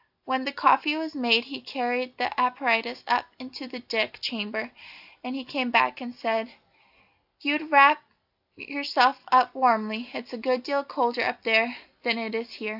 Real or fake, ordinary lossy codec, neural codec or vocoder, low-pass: real; AAC, 48 kbps; none; 5.4 kHz